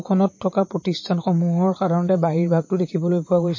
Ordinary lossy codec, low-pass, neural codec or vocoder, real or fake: MP3, 32 kbps; 7.2 kHz; vocoder, 44.1 kHz, 80 mel bands, Vocos; fake